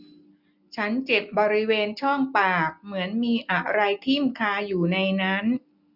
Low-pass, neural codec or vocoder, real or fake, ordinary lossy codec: 5.4 kHz; none; real; none